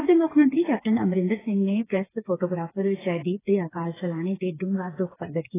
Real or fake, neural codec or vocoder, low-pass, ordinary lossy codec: fake; codec, 16 kHz, 4 kbps, FreqCodec, smaller model; 3.6 kHz; AAC, 16 kbps